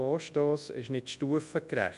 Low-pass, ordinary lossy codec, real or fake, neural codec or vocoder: 10.8 kHz; MP3, 64 kbps; fake; codec, 24 kHz, 0.9 kbps, WavTokenizer, large speech release